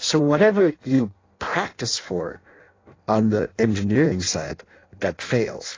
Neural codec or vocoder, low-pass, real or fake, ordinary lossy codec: codec, 16 kHz in and 24 kHz out, 0.6 kbps, FireRedTTS-2 codec; 7.2 kHz; fake; AAC, 32 kbps